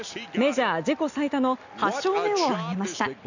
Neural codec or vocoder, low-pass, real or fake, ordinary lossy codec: none; 7.2 kHz; real; none